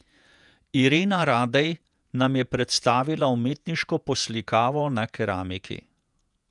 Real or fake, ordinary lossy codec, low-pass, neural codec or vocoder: fake; none; 10.8 kHz; vocoder, 48 kHz, 128 mel bands, Vocos